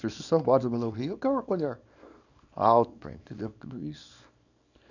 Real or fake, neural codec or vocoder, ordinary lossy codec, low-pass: fake; codec, 24 kHz, 0.9 kbps, WavTokenizer, small release; none; 7.2 kHz